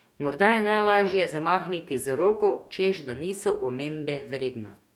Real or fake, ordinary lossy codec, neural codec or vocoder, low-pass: fake; none; codec, 44.1 kHz, 2.6 kbps, DAC; 19.8 kHz